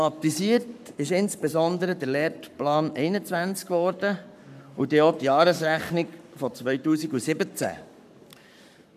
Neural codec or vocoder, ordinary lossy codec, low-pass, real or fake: codec, 44.1 kHz, 7.8 kbps, Pupu-Codec; none; 14.4 kHz; fake